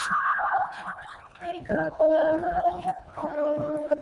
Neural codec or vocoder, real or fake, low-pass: codec, 24 kHz, 1.5 kbps, HILCodec; fake; 10.8 kHz